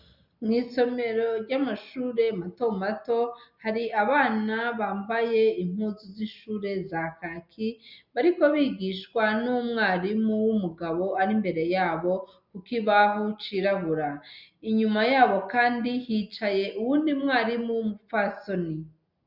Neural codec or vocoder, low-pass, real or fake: none; 5.4 kHz; real